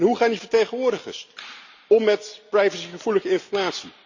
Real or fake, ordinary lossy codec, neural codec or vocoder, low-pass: real; Opus, 64 kbps; none; 7.2 kHz